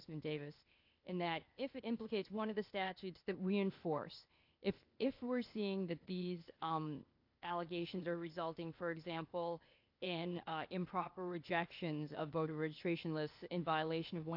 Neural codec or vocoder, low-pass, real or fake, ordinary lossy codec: codec, 16 kHz, 0.8 kbps, ZipCodec; 5.4 kHz; fake; AAC, 48 kbps